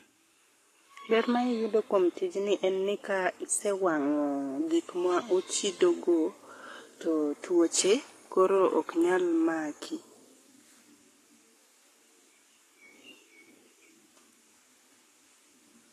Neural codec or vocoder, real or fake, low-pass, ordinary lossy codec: codec, 44.1 kHz, 7.8 kbps, Pupu-Codec; fake; 14.4 kHz; AAC, 48 kbps